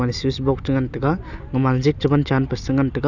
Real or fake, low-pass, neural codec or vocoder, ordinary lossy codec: real; 7.2 kHz; none; none